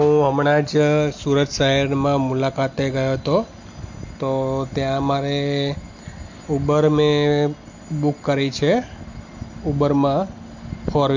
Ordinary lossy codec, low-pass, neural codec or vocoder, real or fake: MP3, 48 kbps; 7.2 kHz; none; real